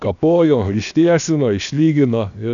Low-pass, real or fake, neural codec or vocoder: 7.2 kHz; fake; codec, 16 kHz, 0.7 kbps, FocalCodec